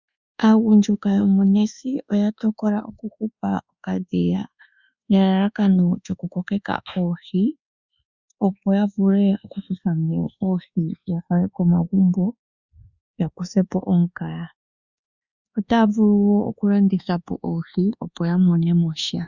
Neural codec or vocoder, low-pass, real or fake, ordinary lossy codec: codec, 24 kHz, 1.2 kbps, DualCodec; 7.2 kHz; fake; Opus, 64 kbps